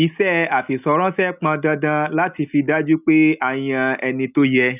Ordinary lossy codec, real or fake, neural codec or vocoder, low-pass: none; real; none; 3.6 kHz